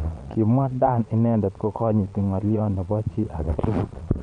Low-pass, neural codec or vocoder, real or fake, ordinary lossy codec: 9.9 kHz; vocoder, 22.05 kHz, 80 mel bands, Vocos; fake; none